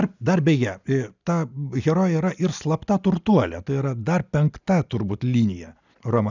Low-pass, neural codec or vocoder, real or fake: 7.2 kHz; none; real